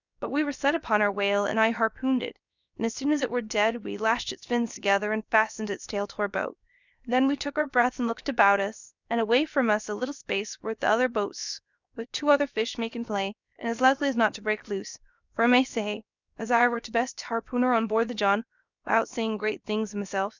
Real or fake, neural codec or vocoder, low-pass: fake; codec, 16 kHz, 0.7 kbps, FocalCodec; 7.2 kHz